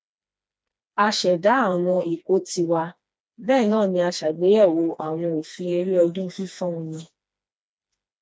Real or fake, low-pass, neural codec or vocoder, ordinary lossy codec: fake; none; codec, 16 kHz, 2 kbps, FreqCodec, smaller model; none